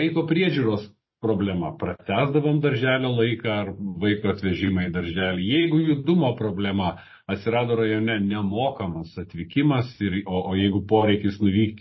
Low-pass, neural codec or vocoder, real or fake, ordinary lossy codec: 7.2 kHz; none; real; MP3, 24 kbps